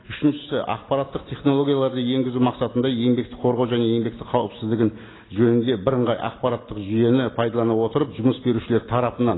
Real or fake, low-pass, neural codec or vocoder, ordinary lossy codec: real; 7.2 kHz; none; AAC, 16 kbps